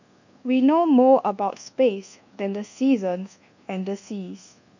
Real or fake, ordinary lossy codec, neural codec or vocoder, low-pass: fake; none; codec, 24 kHz, 1.2 kbps, DualCodec; 7.2 kHz